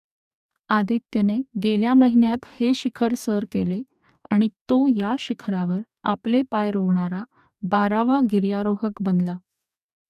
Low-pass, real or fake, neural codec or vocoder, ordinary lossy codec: 14.4 kHz; fake; codec, 44.1 kHz, 2.6 kbps, DAC; none